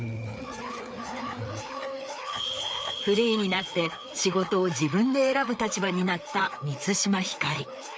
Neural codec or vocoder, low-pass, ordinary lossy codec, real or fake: codec, 16 kHz, 4 kbps, FreqCodec, larger model; none; none; fake